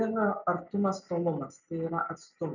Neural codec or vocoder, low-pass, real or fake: none; 7.2 kHz; real